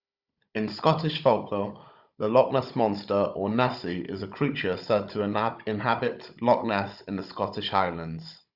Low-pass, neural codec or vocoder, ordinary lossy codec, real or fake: 5.4 kHz; codec, 16 kHz, 16 kbps, FunCodec, trained on Chinese and English, 50 frames a second; Opus, 64 kbps; fake